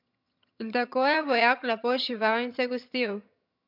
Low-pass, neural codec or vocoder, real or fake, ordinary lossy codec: 5.4 kHz; vocoder, 22.05 kHz, 80 mel bands, HiFi-GAN; fake; MP3, 48 kbps